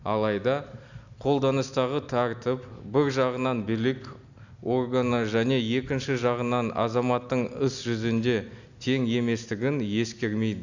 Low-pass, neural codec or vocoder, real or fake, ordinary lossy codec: 7.2 kHz; none; real; none